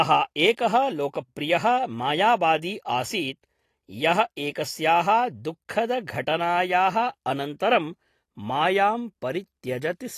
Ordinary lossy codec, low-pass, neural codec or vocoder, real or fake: AAC, 48 kbps; 14.4 kHz; none; real